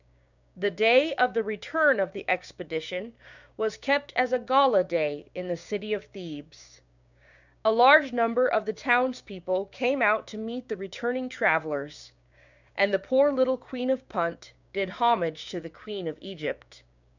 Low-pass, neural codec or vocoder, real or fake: 7.2 kHz; codec, 16 kHz, 6 kbps, DAC; fake